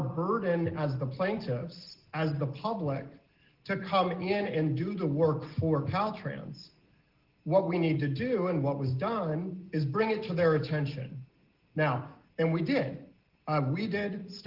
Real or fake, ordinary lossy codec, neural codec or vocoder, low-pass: real; Opus, 16 kbps; none; 5.4 kHz